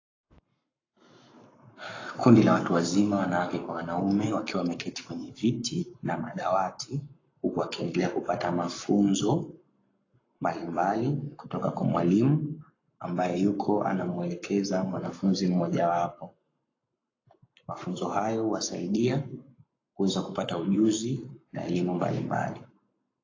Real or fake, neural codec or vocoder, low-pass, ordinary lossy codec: fake; codec, 44.1 kHz, 7.8 kbps, Pupu-Codec; 7.2 kHz; AAC, 32 kbps